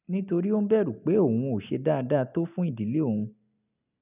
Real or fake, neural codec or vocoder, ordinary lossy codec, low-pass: real; none; none; 3.6 kHz